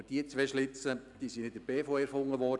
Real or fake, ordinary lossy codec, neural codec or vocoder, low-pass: real; none; none; 10.8 kHz